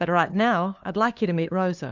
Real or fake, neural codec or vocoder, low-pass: fake; codec, 16 kHz, 2 kbps, FunCodec, trained on LibriTTS, 25 frames a second; 7.2 kHz